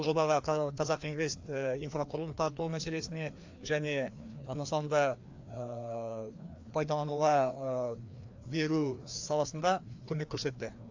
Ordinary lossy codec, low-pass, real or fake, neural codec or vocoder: AAC, 48 kbps; 7.2 kHz; fake; codec, 16 kHz, 2 kbps, FreqCodec, larger model